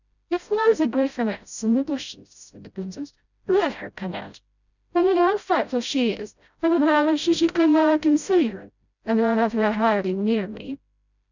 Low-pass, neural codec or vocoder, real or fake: 7.2 kHz; codec, 16 kHz, 0.5 kbps, FreqCodec, smaller model; fake